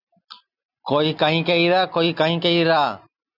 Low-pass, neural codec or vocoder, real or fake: 5.4 kHz; none; real